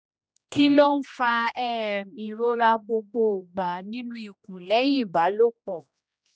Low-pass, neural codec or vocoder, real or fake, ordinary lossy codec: none; codec, 16 kHz, 1 kbps, X-Codec, HuBERT features, trained on general audio; fake; none